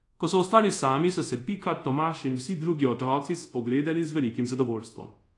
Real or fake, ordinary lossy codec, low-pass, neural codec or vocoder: fake; AAC, 48 kbps; 10.8 kHz; codec, 24 kHz, 0.5 kbps, DualCodec